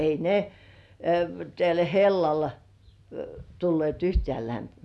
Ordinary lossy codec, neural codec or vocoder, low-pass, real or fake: none; none; none; real